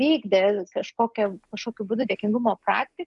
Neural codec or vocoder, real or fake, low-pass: none; real; 10.8 kHz